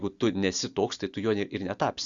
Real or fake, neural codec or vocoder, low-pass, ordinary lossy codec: real; none; 7.2 kHz; Opus, 64 kbps